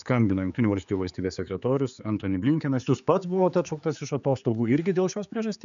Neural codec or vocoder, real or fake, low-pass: codec, 16 kHz, 4 kbps, X-Codec, HuBERT features, trained on general audio; fake; 7.2 kHz